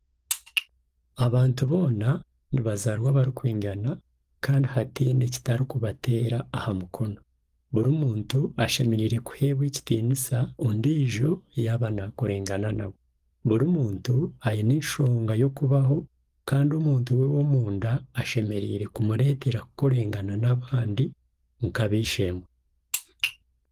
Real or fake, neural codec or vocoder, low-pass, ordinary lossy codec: fake; codec, 44.1 kHz, 7.8 kbps, DAC; 14.4 kHz; Opus, 24 kbps